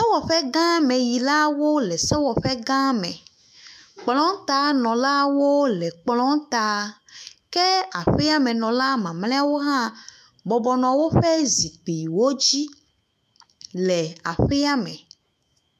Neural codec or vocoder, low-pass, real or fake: autoencoder, 48 kHz, 128 numbers a frame, DAC-VAE, trained on Japanese speech; 14.4 kHz; fake